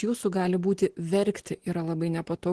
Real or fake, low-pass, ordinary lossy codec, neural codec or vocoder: real; 10.8 kHz; Opus, 16 kbps; none